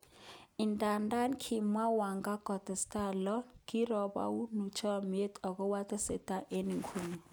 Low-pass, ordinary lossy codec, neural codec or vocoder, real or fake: none; none; none; real